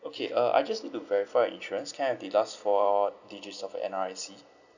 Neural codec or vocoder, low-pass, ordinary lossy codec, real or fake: none; 7.2 kHz; none; real